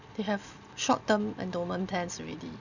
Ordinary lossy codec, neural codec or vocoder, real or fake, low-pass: none; none; real; 7.2 kHz